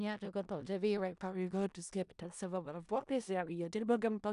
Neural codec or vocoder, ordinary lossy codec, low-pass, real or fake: codec, 16 kHz in and 24 kHz out, 0.4 kbps, LongCat-Audio-Codec, four codebook decoder; AAC, 96 kbps; 10.8 kHz; fake